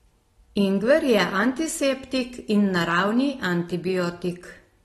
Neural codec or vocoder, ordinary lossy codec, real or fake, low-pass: none; AAC, 32 kbps; real; 19.8 kHz